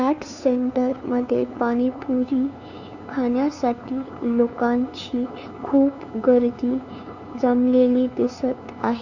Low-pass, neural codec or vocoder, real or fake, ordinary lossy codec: 7.2 kHz; codec, 16 kHz, 2 kbps, FunCodec, trained on LibriTTS, 25 frames a second; fake; none